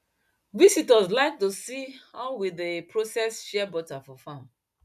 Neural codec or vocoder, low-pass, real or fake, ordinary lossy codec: none; 14.4 kHz; real; none